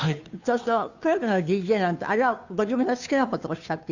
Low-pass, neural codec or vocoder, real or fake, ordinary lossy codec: 7.2 kHz; codec, 16 kHz, 2 kbps, FunCodec, trained on Chinese and English, 25 frames a second; fake; none